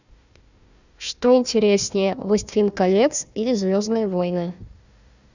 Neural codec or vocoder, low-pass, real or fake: codec, 16 kHz, 1 kbps, FunCodec, trained on Chinese and English, 50 frames a second; 7.2 kHz; fake